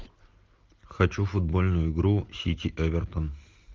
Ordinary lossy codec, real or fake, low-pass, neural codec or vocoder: Opus, 32 kbps; real; 7.2 kHz; none